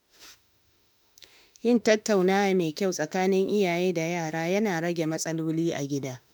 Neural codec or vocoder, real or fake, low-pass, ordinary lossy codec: autoencoder, 48 kHz, 32 numbers a frame, DAC-VAE, trained on Japanese speech; fake; none; none